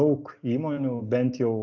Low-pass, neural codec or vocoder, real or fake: 7.2 kHz; none; real